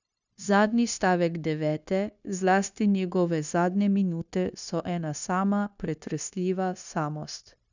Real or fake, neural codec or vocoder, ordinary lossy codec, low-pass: fake; codec, 16 kHz, 0.9 kbps, LongCat-Audio-Codec; none; 7.2 kHz